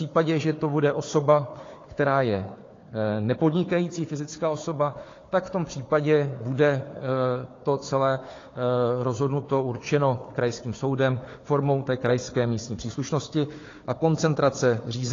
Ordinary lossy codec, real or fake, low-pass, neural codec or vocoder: AAC, 32 kbps; fake; 7.2 kHz; codec, 16 kHz, 4 kbps, FunCodec, trained on Chinese and English, 50 frames a second